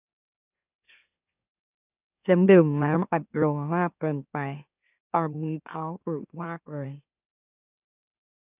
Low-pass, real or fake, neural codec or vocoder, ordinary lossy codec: 3.6 kHz; fake; autoencoder, 44.1 kHz, a latent of 192 numbers a frame, MeloTTS; none